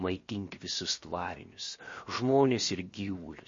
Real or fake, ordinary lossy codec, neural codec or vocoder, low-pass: fake; MP3, 32 kbps; codec, 16 kHz, 0.7 kbps, FocalCodec; 7.2 kHz